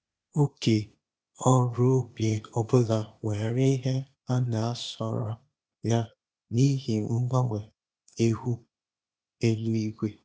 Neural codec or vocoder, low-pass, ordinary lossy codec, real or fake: codec, 16 kHz, 0.8 kbps, ZipCodec; none; none; fake